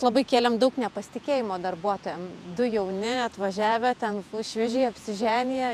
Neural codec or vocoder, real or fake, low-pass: vocoder, 48 kHz, 128 mel bands, Vocos; fake; 14.4 kHz